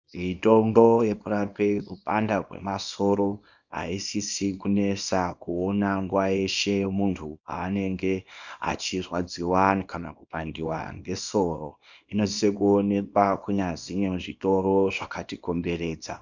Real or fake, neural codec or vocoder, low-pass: fake; codec, 24 kHz, 0.9 kbps, WavTokenizer, small release; 7.2 kHz